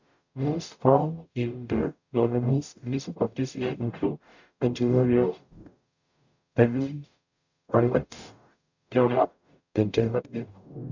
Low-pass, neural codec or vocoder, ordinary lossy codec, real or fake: 7.2 kHz; codec, 44.1 kHz, 0.9 kbps, DAC; none; fake